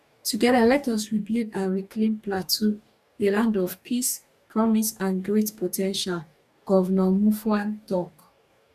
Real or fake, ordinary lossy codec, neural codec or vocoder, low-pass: fake; none; codec, 44.1 kHz, 2.6 kbps, DAC; 14.4 kHz